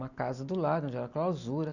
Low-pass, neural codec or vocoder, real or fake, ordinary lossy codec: 7.2 kHz; none; real; none